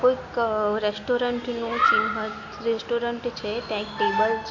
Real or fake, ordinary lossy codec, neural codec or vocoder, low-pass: real; AAC, 48 kbps; none; 7.2 kHz